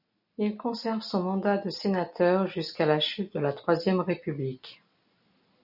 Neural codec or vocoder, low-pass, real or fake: none; 5.4 kHz; real